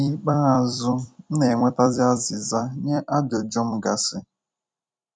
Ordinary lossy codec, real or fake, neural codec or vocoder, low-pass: none; fake; vocoder, 44.1 kHz, 128 mel bands every 256 samples, BigVGAN v2; 9.9 kHz